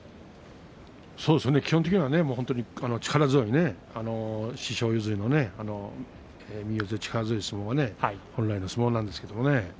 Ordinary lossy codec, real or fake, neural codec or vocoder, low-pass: none; real; none; none